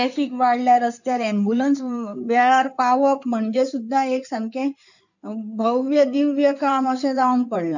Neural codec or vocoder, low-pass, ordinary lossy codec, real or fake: codec, 16 kHz in and 24 kHz out, 2.2 kbps, FireRedTTS-2 codec; 7.2 kHz; none; fake